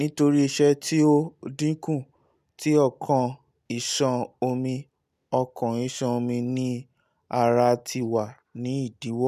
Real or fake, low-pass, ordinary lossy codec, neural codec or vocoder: real; 14.4 kHz; none; none